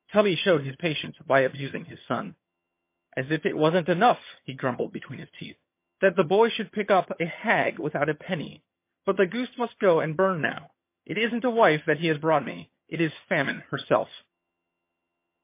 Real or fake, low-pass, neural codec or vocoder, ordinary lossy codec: fake; 3.6 kHz; vocoder, 22.05 kHz, 80 mel bands, HiFi-GAN; MP3, 24 kbps